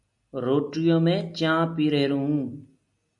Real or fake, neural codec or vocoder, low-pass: real; none; 10.8 kHz